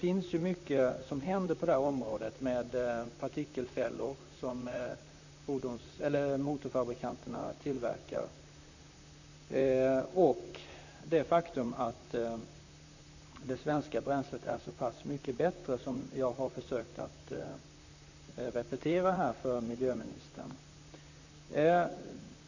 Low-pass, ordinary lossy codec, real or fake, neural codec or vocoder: 7.2 kHz; none; fake; vocoder, 44.1 kHz, 128 mel bands, Pupu-Vocoder